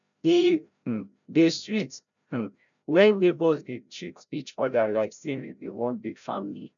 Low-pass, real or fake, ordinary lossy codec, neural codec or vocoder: 7.2 kHz; fake; none; codec, 16 kHz, 0.5 kbps, FreqCodec, larger model